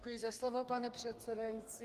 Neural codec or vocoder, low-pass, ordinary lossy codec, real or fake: codec, 32 kHz, 1.9 kbps, SNAC; 14.4 kHz; Opus, 24 kbps; fake